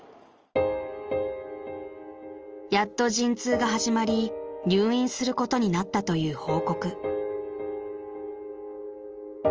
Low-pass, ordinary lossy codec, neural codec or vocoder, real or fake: 7.2 kHz; Opus, 24 kbps; none; real